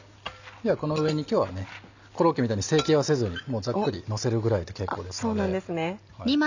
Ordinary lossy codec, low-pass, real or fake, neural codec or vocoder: none; 7.2 kHz; real; none